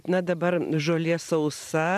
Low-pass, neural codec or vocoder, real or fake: 14.4 kHz; vocoder, 44.1 kHz, 128 mel bands every 512 samples, BigVGAN v2; fake